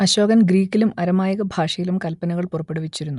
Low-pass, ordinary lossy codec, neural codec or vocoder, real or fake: 10.8 kHz; none; none; real